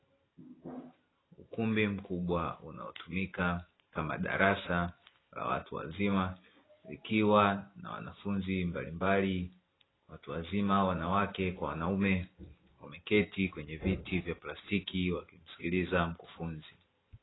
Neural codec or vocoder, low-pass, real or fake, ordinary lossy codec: none; 7.2 kHz; real; AAC, 16 kbps